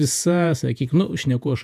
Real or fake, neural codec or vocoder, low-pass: fake; vocoder, 48 kHz, 128 mel bands, Vocos; 14.4 kHz